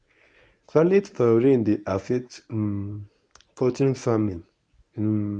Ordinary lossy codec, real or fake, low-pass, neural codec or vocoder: none; fake; 9.9 kHz; codec, 24 kHz, 0.9 kbps, WavTokenizer, medium speech release version 1